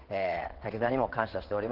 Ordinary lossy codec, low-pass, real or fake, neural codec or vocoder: Opus, 16 kbps; 5.4 kHz; fake; vocoder, 22.05 kHz, 80 mel bands, WaveNeXt